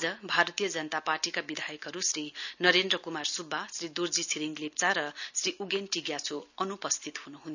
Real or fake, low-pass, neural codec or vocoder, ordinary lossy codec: real; 7.2 kHz; none; none